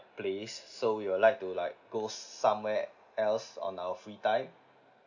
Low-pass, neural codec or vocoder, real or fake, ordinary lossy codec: 7.2 kHz; none; real; none